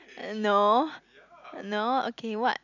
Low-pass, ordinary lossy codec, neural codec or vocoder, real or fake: 7.2 kHz; none; none; real